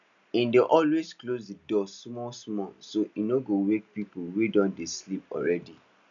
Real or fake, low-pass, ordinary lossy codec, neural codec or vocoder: real; 7.2 kHz; none; none